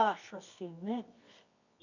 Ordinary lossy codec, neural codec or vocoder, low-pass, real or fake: none; codec, 24 kHz, 0.9 kbps, WavTokenizer, medium music audio release; 7.2 kHz; fake